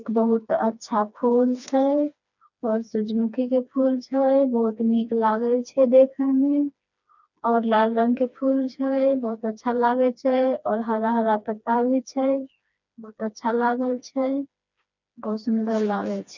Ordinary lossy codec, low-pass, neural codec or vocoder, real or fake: none; 7.2 kHz; codec, 16 kHz, 2 kbps, FreqCodec, smaller model; fake